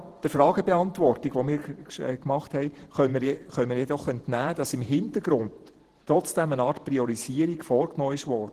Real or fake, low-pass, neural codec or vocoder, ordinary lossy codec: fake; 14.4 kHz; vocoder, 48 kHz, 128 mel bands, Vocos; Opus, 16 kbps